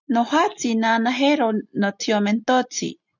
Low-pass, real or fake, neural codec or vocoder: 7.2 kHz; real; none